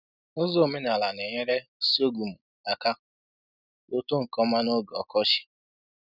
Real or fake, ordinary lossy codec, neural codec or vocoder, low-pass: real; none; none; 5.4 kHz